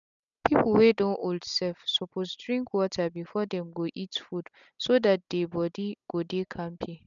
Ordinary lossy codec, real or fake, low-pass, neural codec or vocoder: none; real; 7.2 kHz; none